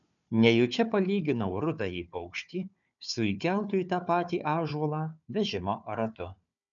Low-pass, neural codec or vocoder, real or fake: 7.2 kHz; codec, 16 kHz, 4 kbps, FunCodec, trained on Chinese and English, 50 frames a second; fake